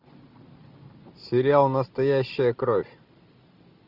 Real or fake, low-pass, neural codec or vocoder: real; 5.4 kHz; none